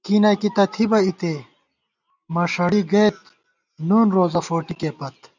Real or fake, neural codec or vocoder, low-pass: real; none; 7.2 kHz